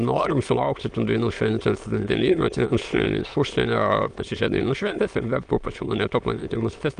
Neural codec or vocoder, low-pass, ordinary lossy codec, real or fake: autoencoder, 22.05 kHz, a latent of 192 numbers a frame, VITS, trained on many speakers; 9.9 kHz; Opus, 32 kbps; fake